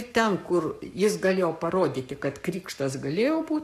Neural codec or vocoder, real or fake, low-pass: codec, 44.1 kHz, 7.8 kbps, Pupu-Codec; fake; 14.4 kHz